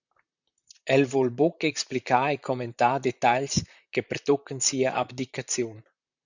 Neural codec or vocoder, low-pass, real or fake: vocoder, 44.1 kHz, 128 mel bands, Pupu-Vocoder; 7.2 kHz; fake